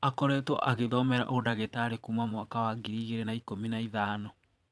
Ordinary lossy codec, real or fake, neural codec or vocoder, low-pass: none; fake; vocoder, 22.05 kHz, 80 mel bands, Vocos; none